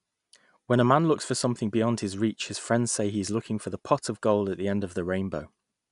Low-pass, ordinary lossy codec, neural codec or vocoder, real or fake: 10.8 kHz; none; none; real